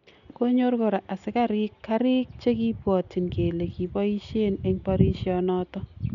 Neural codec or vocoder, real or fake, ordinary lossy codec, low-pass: none; real; none; 7.2 kHz